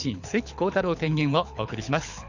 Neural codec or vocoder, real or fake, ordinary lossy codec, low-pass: codec, 24 kHz, 6 kbps, HILCodec; fake; none; 7.2 kHz